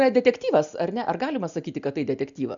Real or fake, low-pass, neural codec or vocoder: real; 7.2 kHz; none